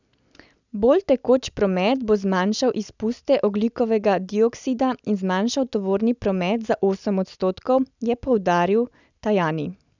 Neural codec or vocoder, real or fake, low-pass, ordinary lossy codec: none; real; 7.2 kHz; none